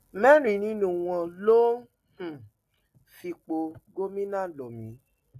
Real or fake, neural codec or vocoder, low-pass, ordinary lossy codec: real; none; 14.4 kHz; AAC, 64 kbps